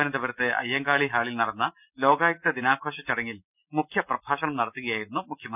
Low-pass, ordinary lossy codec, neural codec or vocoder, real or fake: 3.6 kHz; none; none; real